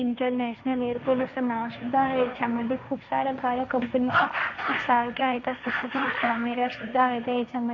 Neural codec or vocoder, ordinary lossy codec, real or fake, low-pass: codec, 16 kHz, 1.1 kbps, Voila-Tokenizer; none; fake; 7.2 kHz